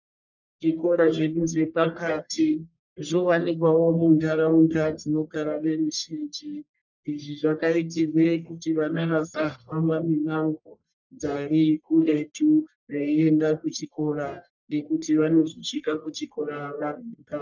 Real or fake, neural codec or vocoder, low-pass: fake; codec, 44.1 kHz, 1.7 kbps, Pupu-Codec; 7.2 kHz